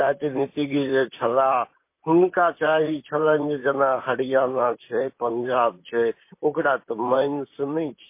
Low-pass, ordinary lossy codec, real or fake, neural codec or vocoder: 3.6 kHz; MP3, 24 kbps; fake; vocoder, 44.1 kHz, 128 mel bands, Pupu-Vocoder